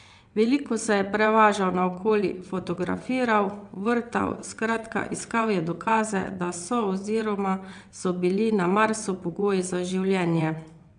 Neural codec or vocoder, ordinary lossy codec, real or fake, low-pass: vocoder, 22.05 kHz, 80 mel bands, WaveNeXt; AAC, 96 kbps; fake; 9.9 kHz